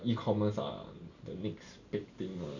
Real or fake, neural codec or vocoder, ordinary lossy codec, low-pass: real; none; none; 7.2 kHz